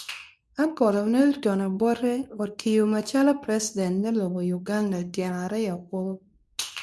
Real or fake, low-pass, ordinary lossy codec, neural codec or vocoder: fake; none; none; codec, 24 kHz, 0.9 kbps, WavTokenizer, medium speech release version 2